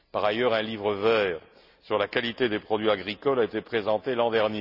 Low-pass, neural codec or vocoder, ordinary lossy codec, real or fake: 5.4 kHz; none; none; real